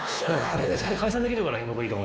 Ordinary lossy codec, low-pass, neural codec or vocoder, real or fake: none; none; codec, 16 kHz, 2 kbps, X-Codec, WavLM features, trained on Multilingual LibriSpeech; fake